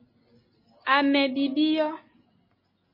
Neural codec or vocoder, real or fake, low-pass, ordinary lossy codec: none; real; 5.4 kHz; MP3, 24 kbps